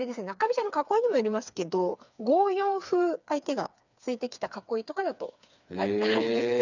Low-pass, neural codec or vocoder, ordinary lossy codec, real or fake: 7.2 kHz; codec, 16 kHz, 4 kbps, FreqCodec, smaller model; none; fake